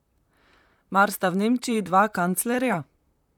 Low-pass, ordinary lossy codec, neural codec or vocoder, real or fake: 19.8 kHz; none; vocoder, 44.1 kHz, 128 mel bands, Pupu-Vocoder; fake